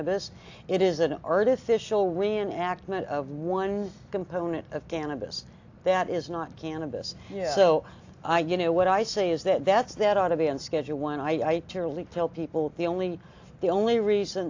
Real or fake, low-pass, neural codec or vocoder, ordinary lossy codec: real; 7.2 kHz; none; AAC, 48 kbps